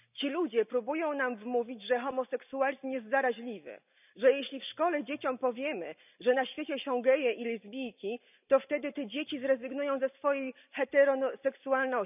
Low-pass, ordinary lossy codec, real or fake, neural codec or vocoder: 3.6 kHz; none; real; none